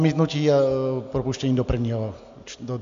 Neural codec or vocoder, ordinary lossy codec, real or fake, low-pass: none; AAC, 64 kbps; real; 7.2 kHz